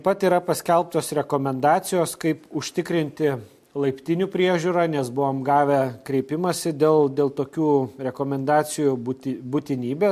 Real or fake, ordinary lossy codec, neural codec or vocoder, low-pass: real; MP3, 64 kbps; none; 14.4 kHz